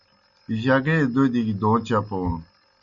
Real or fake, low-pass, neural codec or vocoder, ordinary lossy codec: real; 7.2 kHz; none; MP3, 64 kbps